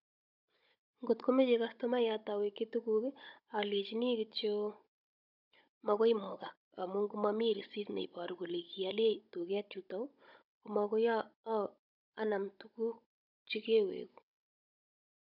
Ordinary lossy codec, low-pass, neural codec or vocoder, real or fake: none; 5.4 kHz; codec, 16 kHz, 16 kbps, FunCodec, trained on Chinese and English, 50 frames a second; fake